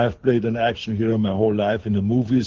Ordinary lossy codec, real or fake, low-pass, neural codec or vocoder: Opus, 16 kbps; fake; 7.2 kHz; codec, 24 kHz, 6 kbps, HILCodec